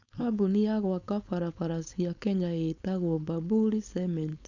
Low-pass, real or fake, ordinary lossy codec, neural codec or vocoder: 7.2 kHz; fake; none; codec, 16 kHz, 4.8 kbps, FACodec